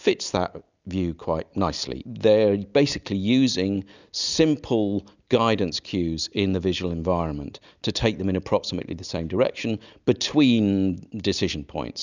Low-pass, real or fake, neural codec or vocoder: 7.2 kHz; real; none